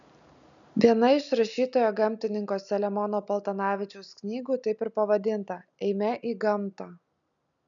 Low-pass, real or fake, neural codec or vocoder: 7.2 kHz; real; none